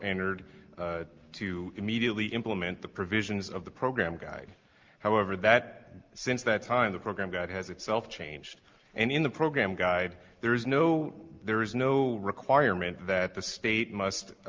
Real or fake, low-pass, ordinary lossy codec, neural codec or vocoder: real; 7.2 kHz; Opus, 32 kbps; none